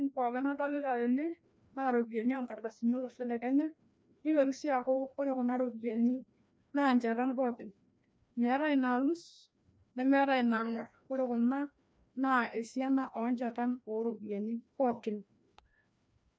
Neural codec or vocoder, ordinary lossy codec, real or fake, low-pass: codec, 16 kHz, 1 kbps, FreqCodec, larger model; none; fake; none